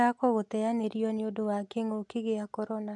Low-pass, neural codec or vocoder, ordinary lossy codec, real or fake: 10.8 kHz; none; MP3, 64 kbps; real